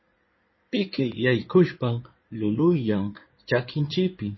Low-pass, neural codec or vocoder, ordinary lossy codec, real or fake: 7.2 kHz; codec, 16 kHz in and 24 kHz out, 2.2 kbps, FireRedTTS-2 codec; MP3, 24 kbps; fake